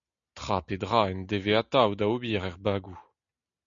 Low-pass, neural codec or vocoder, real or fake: 7.2 kHz; none; real